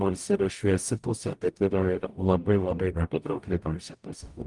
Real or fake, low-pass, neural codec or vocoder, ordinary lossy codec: fake; 10.8 kHz; codec, 44.1 kHz, 0.9 kbps, DAC; Opus, 32 kbps